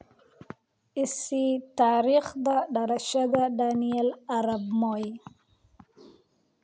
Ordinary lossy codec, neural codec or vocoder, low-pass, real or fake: none; none; none; real